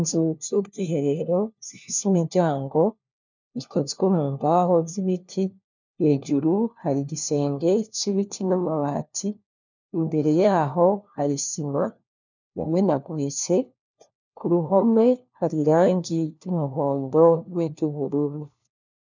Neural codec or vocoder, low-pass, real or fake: codec, 16 kHz, 1 kbps, FunCodec, trained on LibriTTS, 50 frames a second; 7.2 kHz; fake